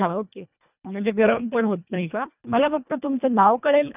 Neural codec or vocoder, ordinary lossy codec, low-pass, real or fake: codec, 24 kHz, 1.5 kbps, HILCodec; none; 3.6 kHz; fake